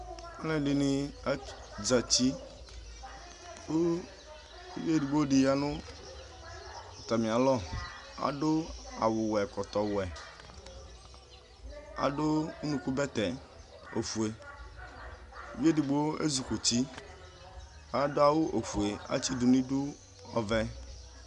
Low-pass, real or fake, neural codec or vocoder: 10.8 kHz; real; none